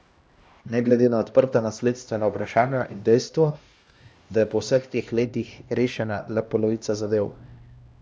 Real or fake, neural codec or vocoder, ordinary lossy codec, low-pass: fake; codec, 16 kHz, 1 kbps, X-Codec, HuBERT features, trained on LibriSpeech; none; none